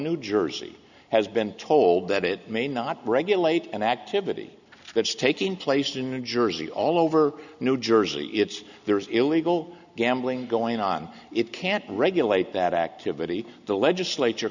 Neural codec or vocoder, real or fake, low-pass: none; real; 7.2 kHz